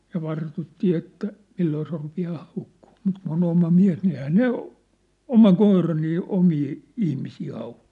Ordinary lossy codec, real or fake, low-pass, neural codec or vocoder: none; real; 10.8 kHz; none